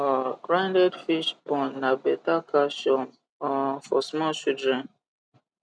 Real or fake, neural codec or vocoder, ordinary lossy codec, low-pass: real; none; none; none